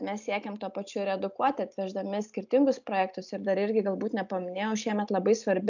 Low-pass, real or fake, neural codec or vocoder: 7.2 kHz; real; none